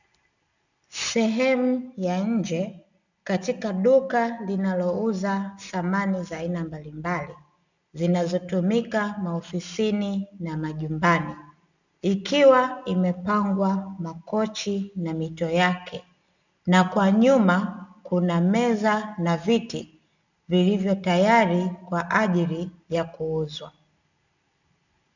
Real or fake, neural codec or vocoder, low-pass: real; none; 7.2 kHz